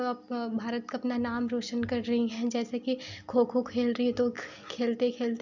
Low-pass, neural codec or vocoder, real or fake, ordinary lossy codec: 7.2 kHz; none; real; none